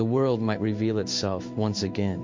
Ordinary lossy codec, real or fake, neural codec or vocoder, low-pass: MP3, 48 kbps; fake; codec, 16 kHz in and 24 kHz out, 1 kbps, XY-Tokenizer; 7.2 kHz